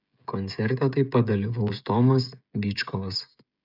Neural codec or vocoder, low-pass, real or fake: codec, 16 kHz, 16 kbps, FreqCodec, smaller model; 5.4 kHz; fake